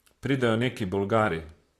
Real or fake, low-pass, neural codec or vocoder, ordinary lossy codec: fake; 14.4 kHz; vocoder, 44.1 kHz, 128 mel bands, Pupu-Vocoder; AAC, 48 kbps